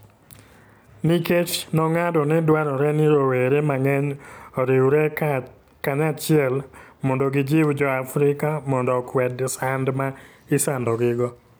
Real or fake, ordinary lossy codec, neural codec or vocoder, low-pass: real; none; none; none